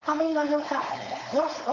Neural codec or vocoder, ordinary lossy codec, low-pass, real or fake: codec, 16 kHz, 4.8 kbps, FACodec; none; 7.2 kHz; fake